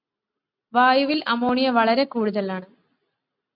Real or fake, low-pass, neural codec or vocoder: real; 5.4 kHz; none